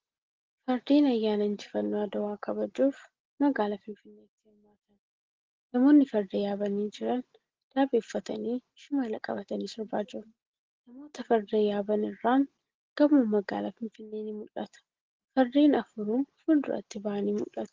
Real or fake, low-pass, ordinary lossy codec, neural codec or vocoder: real; 7.2 kHz; Opus, 16 kbps; none